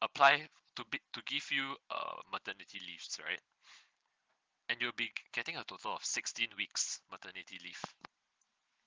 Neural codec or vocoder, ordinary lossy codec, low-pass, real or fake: none; Opus, 16 kbps; 7.2 kHz; real